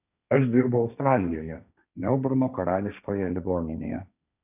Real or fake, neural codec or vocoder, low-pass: fake; codec, 16 kHz, 1.1 kbps, Voila-Tokenizer; 3.6 kHz